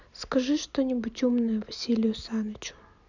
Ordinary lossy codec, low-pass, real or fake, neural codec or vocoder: none; 7.2 kHz; real; none